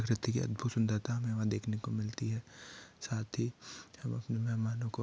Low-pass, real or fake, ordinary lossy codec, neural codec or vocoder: none; real; none; none